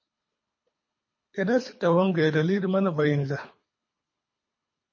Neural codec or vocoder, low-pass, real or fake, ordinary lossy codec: codec, 24 kHz, 3 kbps, HILCodec; 7.2 kHz; fake; MP3, 32 kbps